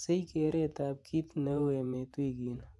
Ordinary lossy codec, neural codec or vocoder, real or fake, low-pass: none; vocoder, 24 kHz, 100 mel bands, Vocos; fake; none